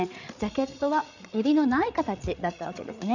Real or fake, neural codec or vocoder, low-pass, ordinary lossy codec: fake; codec, 16 kHz, 8 kbps, FreqCodec, larger model; 7.2 kHz; none